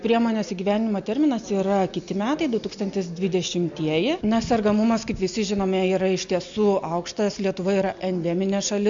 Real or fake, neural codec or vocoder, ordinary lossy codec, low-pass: real; none; MP3, 64 kbps; 7.2 kHz